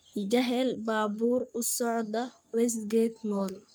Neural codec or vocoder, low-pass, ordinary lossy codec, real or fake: codec, 44.1 kHz, 3.4 kbps, Pupu-Codec; none; none; fake